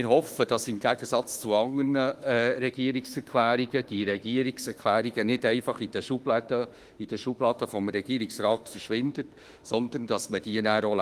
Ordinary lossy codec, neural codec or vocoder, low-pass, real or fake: Opus, 24 kbps; autoencoder, 48 kHz, 32 numbers a frame, DAC-VAE, trained on Japanese speech; 14.4 kHz; fake